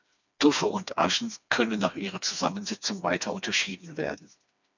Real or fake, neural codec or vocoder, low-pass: fake; codec, 16 kHz, 2 kbps, FreqCodec, smaller model; 7.2 kHz